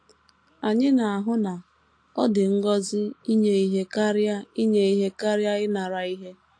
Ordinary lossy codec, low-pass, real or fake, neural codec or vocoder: AAC, 48 kbps; 9.9 kHz; real; none